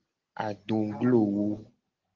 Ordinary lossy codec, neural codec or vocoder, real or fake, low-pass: Opus, 16 kbps; none; real; 7.2 kHz